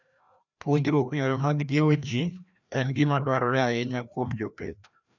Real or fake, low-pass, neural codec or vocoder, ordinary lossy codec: fake; 7.2 kHz; codec, 16 kHz, 1 kbps, FreqCodec, larger model; none